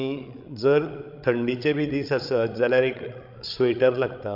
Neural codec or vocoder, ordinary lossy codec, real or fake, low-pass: codec, 16 kHz, 16 kbps, FreqCodec, larger model; MP3, 48 kbps; fake; 5.4 kHz